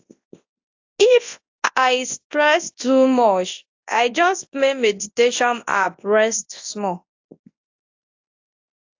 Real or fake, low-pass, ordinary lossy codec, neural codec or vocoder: fake; 7.2 kHz; AAC, 48 kbps; codec, 24 kHz, 0.9 kbps, WavTokenizer, large speech release